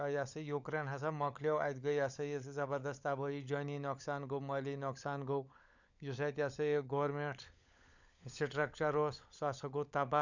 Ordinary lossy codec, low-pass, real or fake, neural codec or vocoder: none; 7.2 kHz; fake; codec, 16 kHz, 16 kbps, FunCodec, trained on LibriTTS, 50 frames a second